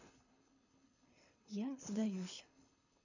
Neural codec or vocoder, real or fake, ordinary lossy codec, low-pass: codec, 24 kHz, 6 kbps, HILCodec; fake; none; 7.2 kHz